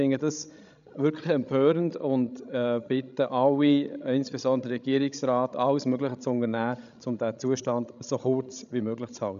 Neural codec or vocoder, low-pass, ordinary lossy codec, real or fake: codec, 16 kHz, 16 kbps, FreqCodec, larger model; 7.2 kHz; none; fake